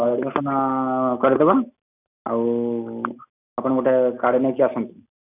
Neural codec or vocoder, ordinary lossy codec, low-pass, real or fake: none; none; 3.6 kHz; real